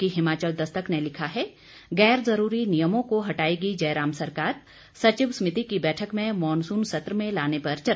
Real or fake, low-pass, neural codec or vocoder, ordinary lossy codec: real; none; none; none